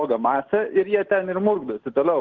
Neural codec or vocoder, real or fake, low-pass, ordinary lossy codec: none; real; 7.2 kHz; Opus, 16 kbps